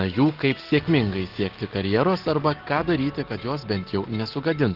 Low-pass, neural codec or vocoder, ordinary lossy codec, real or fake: 5.4 kHz; none; Opus, 16 kbps; real